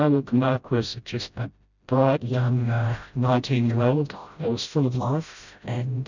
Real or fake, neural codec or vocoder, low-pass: fake; codec, 16 kHz, 0.5 kbps, FreqCodec, smaller model; 7.2 kHz